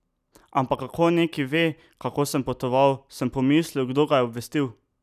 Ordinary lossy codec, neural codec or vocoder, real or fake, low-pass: none; none; real; 14.4 kHz